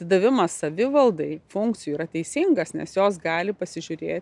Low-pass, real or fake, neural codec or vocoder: 10.8 kHz; real; none